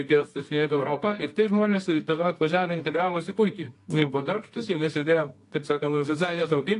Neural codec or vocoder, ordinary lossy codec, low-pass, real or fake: codec, 24 kHz, 0.9 kbps, WavTokenizer, medium music audio release; AAC, 48 kbps; 10.8 kHz; fake